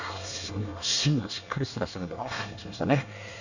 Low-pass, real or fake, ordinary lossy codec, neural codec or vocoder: 7.2 kHz; fake; none; codec, 24 kHz, 1 kbps, SNAC